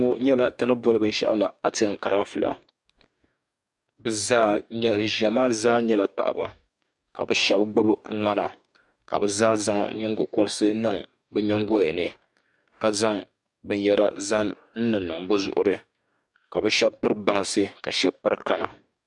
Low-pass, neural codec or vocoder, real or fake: 10.8 kHz; codec, 44.1 kHz, 2.6 kbps, DAC; fake